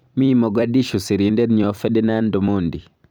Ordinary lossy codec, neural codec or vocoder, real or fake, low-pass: none; vocoder, 44.1 kHz, 128 mel bands every 256 samples, BigVGAN v2; fake; none